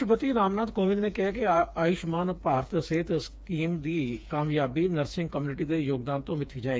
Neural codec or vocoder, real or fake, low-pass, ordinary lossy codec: codec, 16 kHz, 4 kbps, FreqCodec, smaller model; fake; none; none